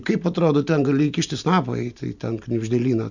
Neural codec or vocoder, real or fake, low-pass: none; real; 7.2 kHz